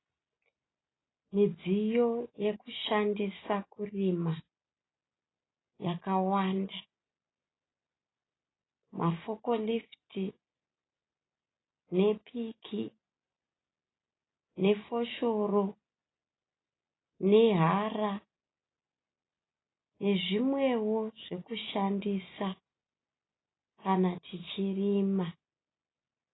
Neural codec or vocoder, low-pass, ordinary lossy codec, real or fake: none; 7.2 kHz; AAC, 16 kbps; real